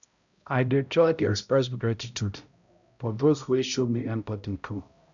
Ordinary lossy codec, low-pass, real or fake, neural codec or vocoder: none; 7.2 kHz; fake; codec, 16 kHz, 0.5 kbps, X-Codec, HuBERT features, trained on balanced general audio